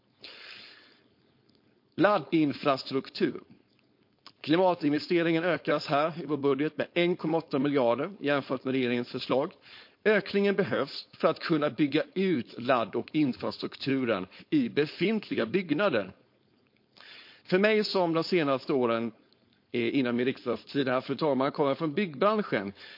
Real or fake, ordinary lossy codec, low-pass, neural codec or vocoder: fake; MP3, 32 kbps; 5.4 kHz; codec, 16 kHz, 4.8 kbps, FACodec